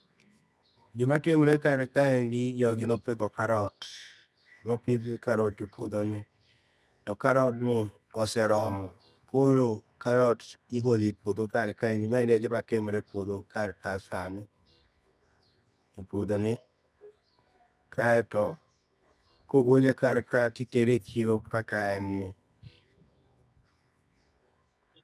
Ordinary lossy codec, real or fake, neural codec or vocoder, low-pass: none; fake; codec, 24 kHz, 0.9 kbps, WavTokenizer, medium music audio release; none